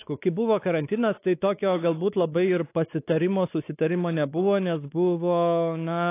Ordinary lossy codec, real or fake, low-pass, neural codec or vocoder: AAC, 24 kbps; fake; 3.6 kHz; codec, 16 kHz, 4 kbps, X-Codec, WavLM features, trained on Multilingual LibriSpeech